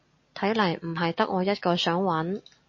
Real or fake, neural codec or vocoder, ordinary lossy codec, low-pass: real; none; MP3, 32 kbps; 7.2 kHz